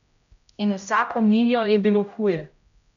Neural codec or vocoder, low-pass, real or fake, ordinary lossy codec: codec, 16 kHz, 0.5 kbps, X-Codec, HuBERT features, trained on general audio; 7.2 kHz; fake; none